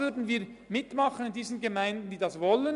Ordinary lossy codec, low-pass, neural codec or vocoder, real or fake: MP3, 96 kbps; 10.8 kHz; none; real